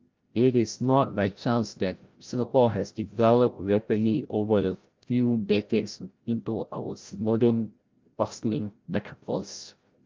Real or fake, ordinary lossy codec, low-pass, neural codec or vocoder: fake; Opus, 24 kbps; 7.2 kHz; codec, 16 kHz, 0.5 kbps, FreqCodec, larger model